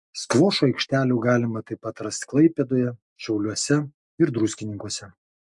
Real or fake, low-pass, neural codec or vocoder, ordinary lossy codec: real; 10.8 kHz; none; MP3, 64 kbps